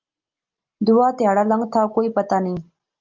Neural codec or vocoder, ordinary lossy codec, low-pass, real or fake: none; Opus, 24 kbps; 7.2 kHz; real